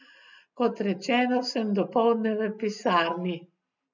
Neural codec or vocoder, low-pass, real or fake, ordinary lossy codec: none; 7.2 kHz; real; none